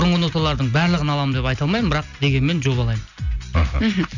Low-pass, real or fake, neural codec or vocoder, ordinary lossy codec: 7.2 kHz; real; none; none